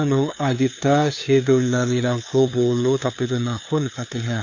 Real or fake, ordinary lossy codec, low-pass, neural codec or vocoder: fake; AAC, 48 kbps; 7.2 kHz; codec, 16 kHz, 2 kbps, FunCodec, trained on LibriTTS, 25 frames a second